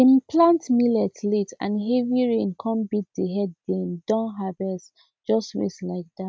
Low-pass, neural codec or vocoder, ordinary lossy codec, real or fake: none; none; none; real